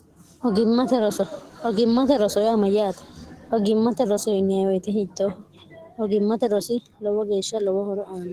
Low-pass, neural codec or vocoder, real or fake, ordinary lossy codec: 14.4 kHz; codec, 44.1 kHz, 7.8 kbps, DAC; fake; Opus, 16 kbps